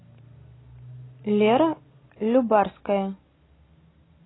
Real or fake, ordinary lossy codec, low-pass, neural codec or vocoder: real; AAC, 16 kbps; 7.2 kHz; none